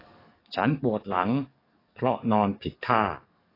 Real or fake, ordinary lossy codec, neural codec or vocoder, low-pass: fake; AAC, 24 kbps; codec, 44.1 kHz, 7.8 kbps, DAC; 5.4 kHz